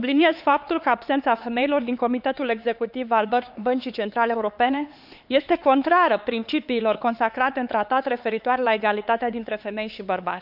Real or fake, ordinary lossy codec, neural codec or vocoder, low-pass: fake; none; codec, 16 kHz, 4 kbps, X-Codec, HuBERT features, trained on LibriSpeech; 5.4 kHz